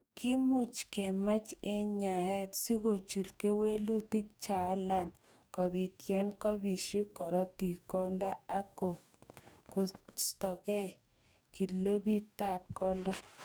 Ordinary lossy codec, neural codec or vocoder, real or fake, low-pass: none; codec, 44.1 kHz, 2.6 kbps, DAC; fake; none